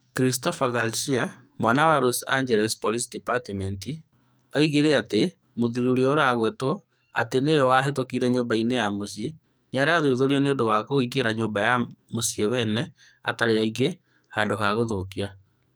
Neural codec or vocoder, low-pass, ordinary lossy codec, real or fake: codec, 44.1 kHz, 2.6 kbps, SNAC; none; none; fake